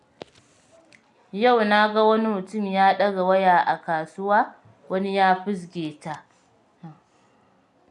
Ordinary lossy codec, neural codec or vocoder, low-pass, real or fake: none; none; 10.8 kHz; real